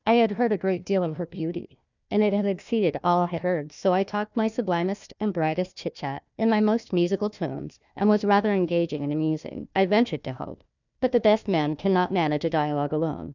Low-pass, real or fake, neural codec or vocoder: 7.2 kHz; fake; codec, 16 kHz, 1 kbps, FunCodec, trained on Chinese and English, 50 frames a second